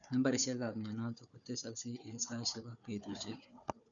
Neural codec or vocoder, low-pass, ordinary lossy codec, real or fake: codec, 16 kHz, 4 kbps, FunCodec, trained on Chinese and English, 50 frames a second; 7.2 kHz; none; fake